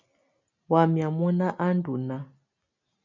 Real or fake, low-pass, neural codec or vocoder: real; 7.2 kHz; none